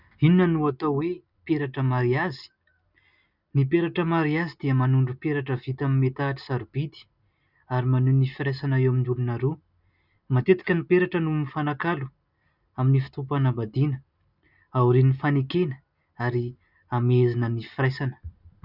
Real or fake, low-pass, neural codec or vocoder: real; 5.4 kHz; none